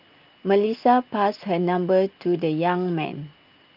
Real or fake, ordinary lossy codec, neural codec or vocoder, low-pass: real; Opus, 24 kbps; none; 5.4 kHz